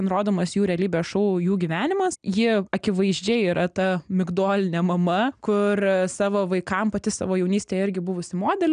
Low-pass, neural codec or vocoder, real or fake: 9.9 kHz; none; real